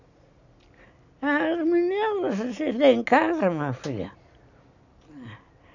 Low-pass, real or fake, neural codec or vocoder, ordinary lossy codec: 7.2 kHz; real; none; none